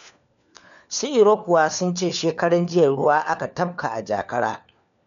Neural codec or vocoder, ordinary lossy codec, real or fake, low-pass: codec, 16 kHz, 4 kbps, FunCodec, trained on LibriTTS, 50 frames a second; none; fake; 7.2 kHz